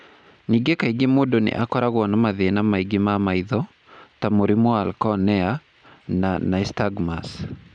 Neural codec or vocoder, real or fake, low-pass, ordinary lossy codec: none; real; 9.9 kHz; none